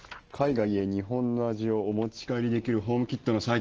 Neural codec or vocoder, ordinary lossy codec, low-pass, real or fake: none; Opus, 16 kbps; 7.2 kHz; real